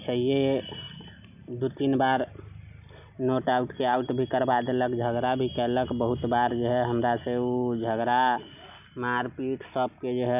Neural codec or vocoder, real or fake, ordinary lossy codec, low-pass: none; real; none; 3.6 kHz